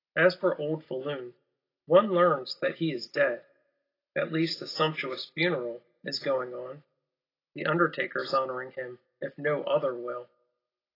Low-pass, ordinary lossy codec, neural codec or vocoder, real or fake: 5.4 kHz; AAC, 24 kbps; none; real